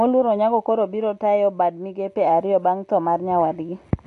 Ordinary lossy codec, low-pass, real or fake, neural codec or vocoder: AAC, 48 kbps; 10.8 kHz; real; none